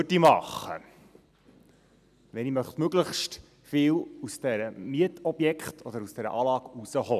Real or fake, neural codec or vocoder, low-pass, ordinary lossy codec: real; none; 14.4 kHz; none